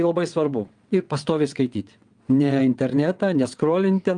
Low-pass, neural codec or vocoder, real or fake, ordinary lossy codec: 9.9 kHz; vocoder, 22.05 kHz, 80 mel bands, WaveNeXt; fake; Opus, 32 kbps